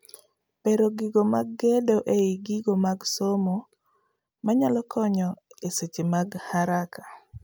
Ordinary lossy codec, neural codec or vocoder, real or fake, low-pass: none; none; real; none